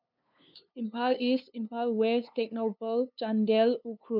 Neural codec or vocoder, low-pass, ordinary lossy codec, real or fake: codec, 16 kHz, 2 kbps, FunCodec, trained on LibriTTS, 25 frames a second; 5.4 kHz; none; fake